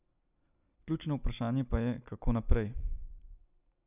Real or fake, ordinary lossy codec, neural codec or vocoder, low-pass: real; none; none; 3.6 kHz